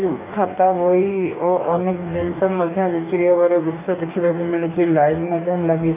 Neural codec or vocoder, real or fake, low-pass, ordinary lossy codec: codec, 44.1 kHz, 2.6 kbps, DAC; fake; 3.6 kHz; none